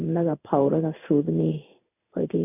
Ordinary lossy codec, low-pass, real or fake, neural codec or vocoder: none; 3.6 kHz; fake; codec, 16 kHz in and 24 kHz out, 1 kbps, XY-Tokenizer